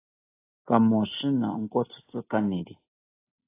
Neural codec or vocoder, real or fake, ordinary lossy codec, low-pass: none; real; AAC, 24 kbps; 3.6 kHz